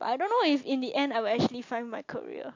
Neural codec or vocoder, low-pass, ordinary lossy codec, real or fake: none; 7.2 kHz; AAC, 48 kbps; real